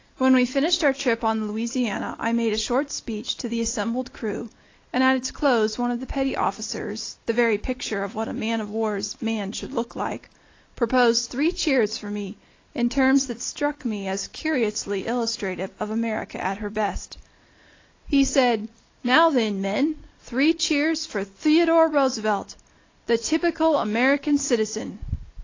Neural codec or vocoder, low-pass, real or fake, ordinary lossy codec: none; 7.2 kHz; real; AAC, 32 kbps